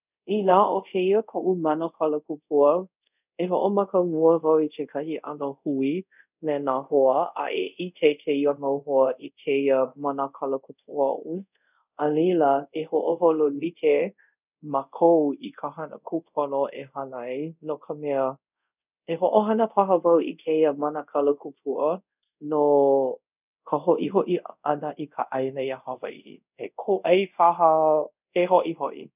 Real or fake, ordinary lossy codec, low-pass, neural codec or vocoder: fake; none; 3.6 kHz; codec, 24 kHz, 0.5 kbps, DualCodec